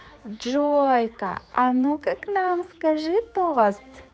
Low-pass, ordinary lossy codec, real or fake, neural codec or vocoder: none; none; fake; codec, 16 kHz, 4 kbps, X-Codec, HuBERT features, trained on general audio